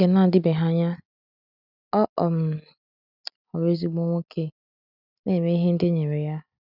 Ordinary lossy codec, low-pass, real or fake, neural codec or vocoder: none; 5.4 kHz; real; none